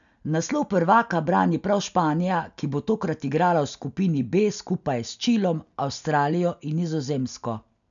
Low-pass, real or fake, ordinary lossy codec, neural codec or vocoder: 7.2 kHz; real; none; none